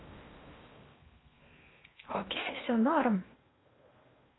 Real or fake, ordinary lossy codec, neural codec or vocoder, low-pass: fake; AAC, 16 kbps; codec, 16 kHz in and 24 kHz out, 0.6 kbps, FocalCodec, streaming, 4096 codes; 7.2 kHz